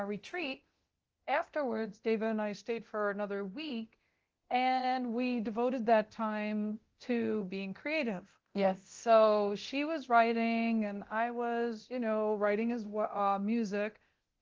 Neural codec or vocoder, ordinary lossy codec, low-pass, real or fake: codec, 24 kHz, 0.9 kbps, DualCodec; Opus, 16 kbps; 7.2 kHz; fake